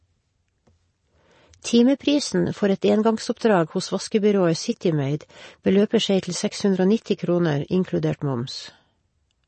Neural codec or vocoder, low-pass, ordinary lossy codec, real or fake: none; 10.8 kHz; MP3, 32 kbps; real